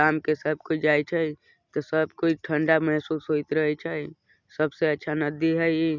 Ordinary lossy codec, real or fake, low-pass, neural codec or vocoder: none; real; 7.2 kHz; none